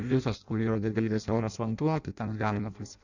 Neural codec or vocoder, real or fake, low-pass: codec, 16 kHz in and 24 kHz out, 0.6 kbps, FireRedTTS-2 codec; fake; 7.2 kHz